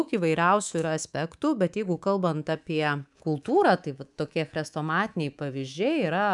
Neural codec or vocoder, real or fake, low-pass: codec, 24 kHz, 3.1 kbps, DualCodec; fake; 10.8 kHz